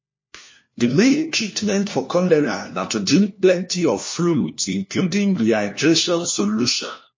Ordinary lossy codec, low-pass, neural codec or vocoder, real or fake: MP3, 32 kbps; 7.2 kHz; codec, 16 kHz, 1 kbps, FunCodec, trained on LibriTTS, 50 frames a second; fake